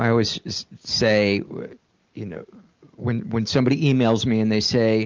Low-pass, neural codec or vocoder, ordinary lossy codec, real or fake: 7.2 kHz; none; Opus, 24 kbps; real